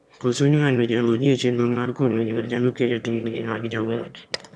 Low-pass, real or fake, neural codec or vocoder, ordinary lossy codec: none; fake; autoencoder, 22.05 kHz, a latent of 192 numbers a frame, VITS, trained on one speaker; none